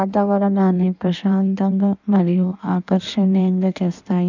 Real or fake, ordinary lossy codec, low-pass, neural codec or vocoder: fake; none; 7.2 kHz; codec, 16 kHz in and 24 kHz out, 1.1 kbps, FireRedTTS-2 codec